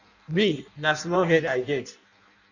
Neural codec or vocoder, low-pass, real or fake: codec, 16 kHz in and 24 kHz out, 0.6 kbps, FireRedTTS-2 codec; 7.2 kHz; fake